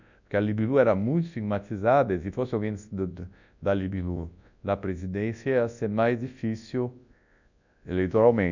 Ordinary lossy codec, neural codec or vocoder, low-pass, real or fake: none; codec, 24 kHz, 0.9 kbps, WavTokenizer, large speech release; 7.2 kHz; fake